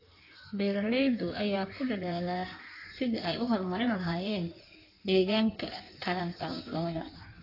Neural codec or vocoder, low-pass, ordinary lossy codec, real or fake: codec, 16 kHz in and 24 kHz out, 1.1 kbps, FireRedTTS-2 codec; 5.4 kHz; AAC, 32 kbps; fake